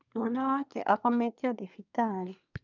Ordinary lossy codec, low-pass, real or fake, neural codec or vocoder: none; 7.2 kHz; fake; codec, 24 kHz, 1 kbps, SNAC